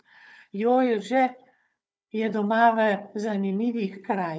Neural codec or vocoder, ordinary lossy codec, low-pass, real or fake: codec, 16 kHz, 4 kbps, FunCodec, trained on Chinese and English, 50 frames a second; none; none; fake